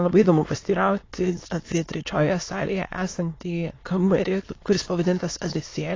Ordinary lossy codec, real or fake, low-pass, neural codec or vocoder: AAC, 32 kbps; fake; 7.2 kHz; autoencoder, 22.05 kHz, a latent of 192 numbers a frame, VITS, trained on many speakers